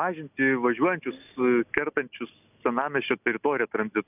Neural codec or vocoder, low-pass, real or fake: none; 3.6 kHz; real